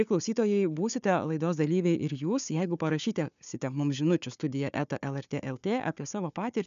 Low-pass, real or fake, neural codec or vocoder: 7.2 kHz; fake; codec, 16 kHz, 4 kbps, FunCodec, trained on Chinese and English, 50 frames a second